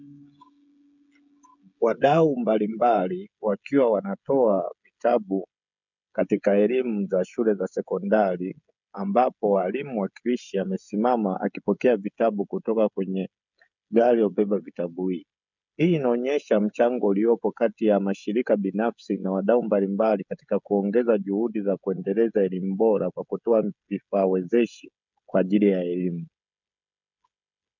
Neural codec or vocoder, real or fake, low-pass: codec, 16 kHz, 16 kbps, FreqCodec, smaller model; fake; 7.2 kHz